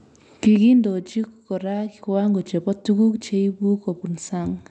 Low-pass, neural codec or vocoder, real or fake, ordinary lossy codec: 10.8 kHz; none; real; none